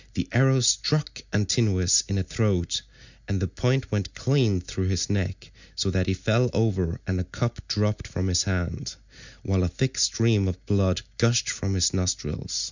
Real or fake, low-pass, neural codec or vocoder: real; 7.2 kHz; none